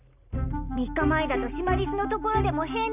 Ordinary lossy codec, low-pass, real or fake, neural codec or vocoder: none; 3.6 kHz; real; none